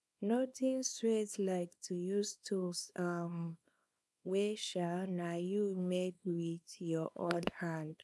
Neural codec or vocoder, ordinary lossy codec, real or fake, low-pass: codec, 24 kHz, 0.9 kbps, WavTokenizer, small release; none; fake; none